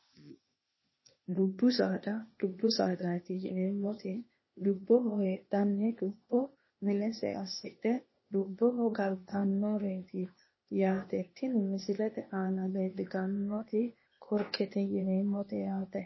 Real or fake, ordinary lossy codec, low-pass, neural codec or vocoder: fake; MP3, 24 kbps; 7.2 kHz; codec, 16 kHz, 0.8 kbps, ZipCodec